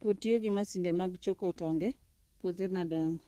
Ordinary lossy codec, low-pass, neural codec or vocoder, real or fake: Opus, 24 kbps; 14.4 kHz; codec, 32 kHz, 1.9 kbps, SNAC; fake